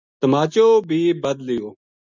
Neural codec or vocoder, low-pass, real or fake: none; 7.2 kHz; real